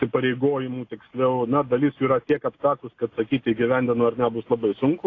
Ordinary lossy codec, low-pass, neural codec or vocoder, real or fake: AAC, 32 kbps; 7.2 kHz; none; real